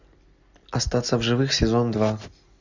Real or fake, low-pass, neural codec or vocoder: real; 7.2 kHz; none